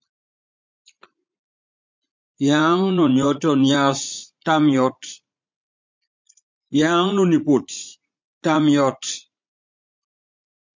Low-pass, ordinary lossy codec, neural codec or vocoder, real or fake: 7.2 kHz; MP3, 64 kbps; vocoder, 44.1 kHz, 80 mel bands, Vocos; fake